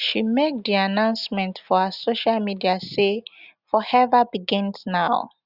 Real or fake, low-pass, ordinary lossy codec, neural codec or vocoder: real; 5.4 kHz; Opus, 64 kbps; none